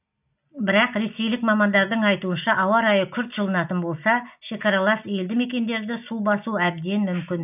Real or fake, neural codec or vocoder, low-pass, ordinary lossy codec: real; none; 3.6 kHz; none